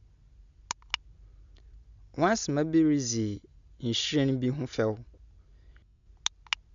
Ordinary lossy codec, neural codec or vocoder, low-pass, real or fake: none; none; 7.2 kHz; real